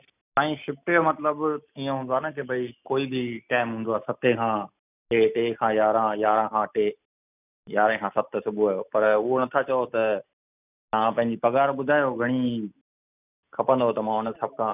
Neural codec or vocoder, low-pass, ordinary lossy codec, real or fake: none; 3.6 kHz; none; real